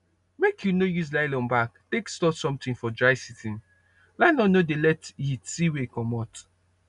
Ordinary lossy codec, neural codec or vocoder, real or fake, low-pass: none; none; real; 10.8 kHz